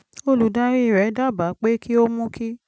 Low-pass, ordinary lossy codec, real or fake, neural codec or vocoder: none; none; real; none